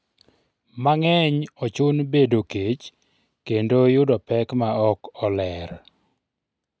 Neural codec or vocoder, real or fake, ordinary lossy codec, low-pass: none; real; none; none